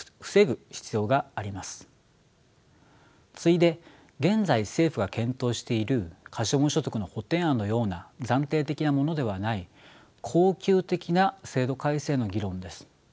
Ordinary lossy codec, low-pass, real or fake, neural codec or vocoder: none; none; real; none